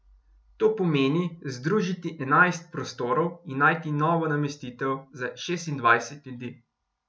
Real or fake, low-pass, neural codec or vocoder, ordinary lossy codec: real; none; none; none